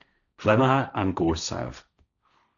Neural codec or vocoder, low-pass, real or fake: codec, 16 kHz, 1.1 kbps, Voila-Tokenizer; 7.2 kHz; fake